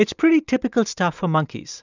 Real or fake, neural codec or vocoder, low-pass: real; none; 7.2 kHz